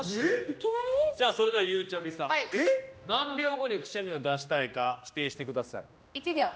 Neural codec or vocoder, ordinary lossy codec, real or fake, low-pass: codec, 16 kHz, 1 kbps, X-Codec, HuBERT features, trained on general audio; none; fake; none